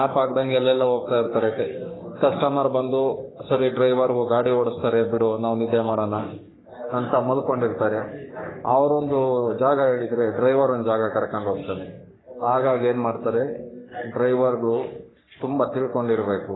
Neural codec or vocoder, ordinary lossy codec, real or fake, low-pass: codec, 44.1 kHz, 3.4 kbps, Pupu-Codec; AAC, 16 kbps; fake; 7.2 kHz